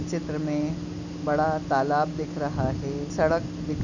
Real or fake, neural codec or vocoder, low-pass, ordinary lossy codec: real; none; 7.2 kHz; none